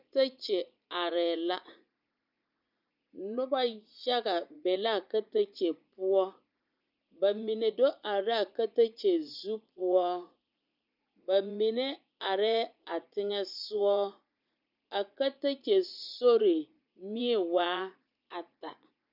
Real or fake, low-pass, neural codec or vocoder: fake; 5.4 kHz; vocoder, 44.1 kHz, 80 mel bands, Vocos